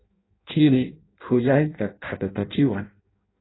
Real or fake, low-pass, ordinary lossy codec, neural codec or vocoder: fake; 7.2 kHz; AAC, 16 kbps; codec, 16 kHz in and 24 kHz out, 0.6 kbps, FireRedTTS-2 codec